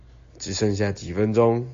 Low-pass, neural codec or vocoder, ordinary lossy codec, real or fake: 7.2 kHz; none; AAC, 48 kbps; real